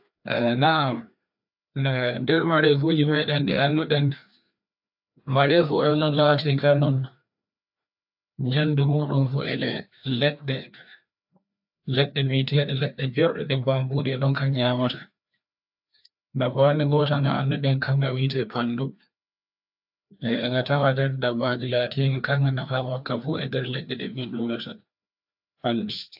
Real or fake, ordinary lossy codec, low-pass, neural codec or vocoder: fake; AAC, 48 kbps; 5.4 kHz; codec, 16 kHz, 2 kbps, FreqCodec, larger model